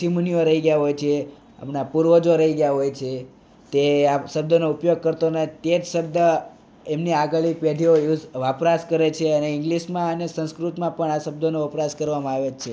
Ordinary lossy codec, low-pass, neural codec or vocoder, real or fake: none; none; none; real